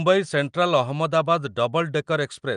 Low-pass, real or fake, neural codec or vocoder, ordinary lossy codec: 9.9 kHz; real; none; Opus, 32 kbps